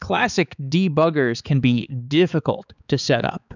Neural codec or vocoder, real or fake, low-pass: codec, 16 kHz, 4 kbps, X-Codec, HuBERT features, trained on balanced general audio; fake; 7.2 kHz